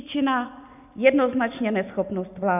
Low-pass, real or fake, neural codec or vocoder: 3.6 kHz; real; none